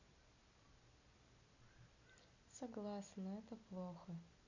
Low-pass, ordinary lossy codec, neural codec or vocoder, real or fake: 7.2 kHz; none; none; real